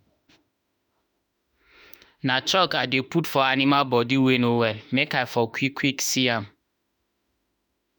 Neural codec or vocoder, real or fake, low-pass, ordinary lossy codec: autoencoder, 48 kHz, 32 numbers a frame, DAC-VAE, trained on Japanese speech; fake; none; none